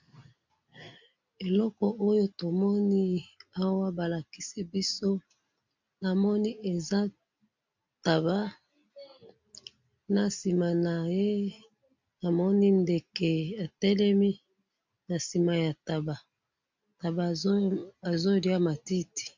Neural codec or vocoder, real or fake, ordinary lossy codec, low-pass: none; real; MP3, 64 kbps; 7.2 kHz